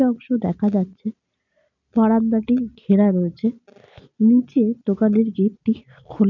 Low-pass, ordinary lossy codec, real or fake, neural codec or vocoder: 7.2 kHz; none; real; none